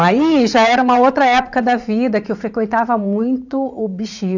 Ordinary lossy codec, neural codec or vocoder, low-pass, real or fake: none; vocoder, 44.1 kHz, 80 mel bands, Vocos; 7.2 kHz; fake